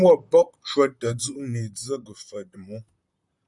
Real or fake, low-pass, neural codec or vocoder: fake; 10.8 kHz; vocoder, 44.1 kHz, 128 mel bands, Pupu-Vocoder